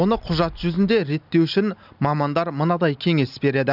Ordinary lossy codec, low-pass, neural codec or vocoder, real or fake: none; 5.4 kHz; none; real